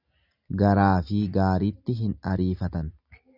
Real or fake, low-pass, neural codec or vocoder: real; 5.4 kHz; none